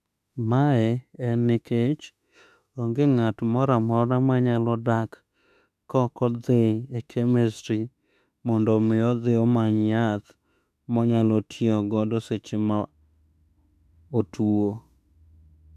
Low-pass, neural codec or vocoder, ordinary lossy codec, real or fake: 14.4 kHz; autoencoder, 48 kHz, 32 numbers a frame, DAC-VAE, trained on Japanese speech; none; fake